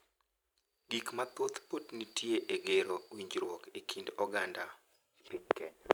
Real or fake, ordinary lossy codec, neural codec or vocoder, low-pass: fake; none; vocoder, 44.1 kHz, 128 mel bands every 512 samples, BigVGAN v2; none